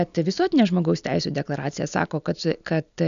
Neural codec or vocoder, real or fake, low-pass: none; real; 7.2 kHz